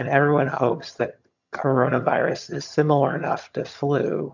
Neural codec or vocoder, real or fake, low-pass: vocoder, 22.05 kHz, 80 mel bands, HiFi-GAN; fake; 7.2 kHz